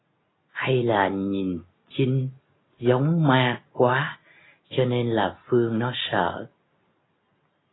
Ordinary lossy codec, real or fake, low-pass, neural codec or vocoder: AAC, 16 kbps; real; 7.2 kHz; none